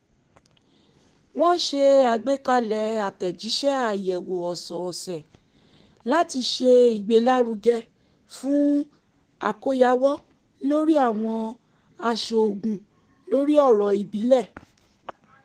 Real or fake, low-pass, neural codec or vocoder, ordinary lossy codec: fake; 14.4 kHz; codec, 32 kHz, 1.9 kbps, SNAC; Opus, 24 kbps